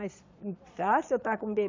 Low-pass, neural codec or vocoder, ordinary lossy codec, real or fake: 7.2 kHz; vocoder, 22.05 kHz, 80 mel bands, Vocos; MP3, 48 kbps; fake